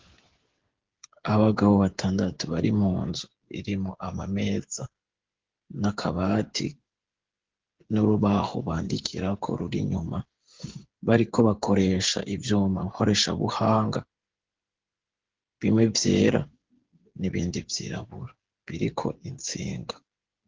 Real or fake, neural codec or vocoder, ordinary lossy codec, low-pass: fake; codec, 16 kHz, 8 kbps, FreqCodec, smaller model; Opus, 16 kbps; 7.2 kHz